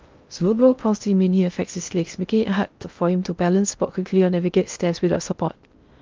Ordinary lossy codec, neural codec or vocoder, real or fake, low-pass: Opus, 24 kbps; codec, 16 kHz in and 24 kHz out, 0.6 kbps, FocalCodec, streaming, 2048 codes; fake; 7.2 kHz